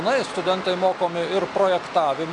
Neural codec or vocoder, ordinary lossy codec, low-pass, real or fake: none; MP3, 64 kbps; 10.8 kHz; real